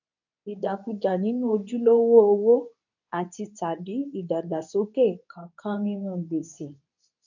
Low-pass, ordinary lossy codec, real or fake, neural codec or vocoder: 7.2 kHz; none; fake; codec, 24 kHz, 0.9 kbps, WavTokenizer, medium speech release version 2